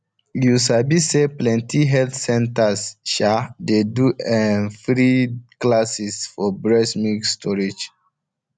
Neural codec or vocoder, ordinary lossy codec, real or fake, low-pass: none; none; real; 9.9 kHz